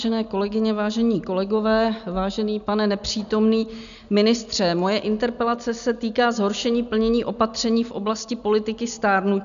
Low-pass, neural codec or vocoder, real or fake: 7.2 kHz; none; real